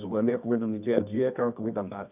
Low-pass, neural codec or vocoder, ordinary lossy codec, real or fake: 3.6 kHz; codec, 24 kHz, 0.9 kbps, WavTokenizer, medium music audio release; none; fake